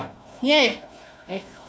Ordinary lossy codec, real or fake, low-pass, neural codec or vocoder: none; fake; none; codec, 16 kHz, 1 kbps, FunCodec, trained on Chinese and English, 50 frames a second